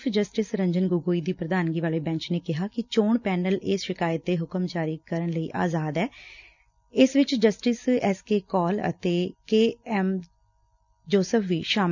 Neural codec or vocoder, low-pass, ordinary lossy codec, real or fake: none; 7.2 kHz; none; real